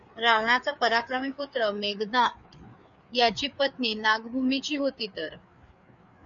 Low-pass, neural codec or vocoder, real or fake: 7.2 kHz; codec, 16 kHz, 4 kbps, FreqCodec, larger model; fake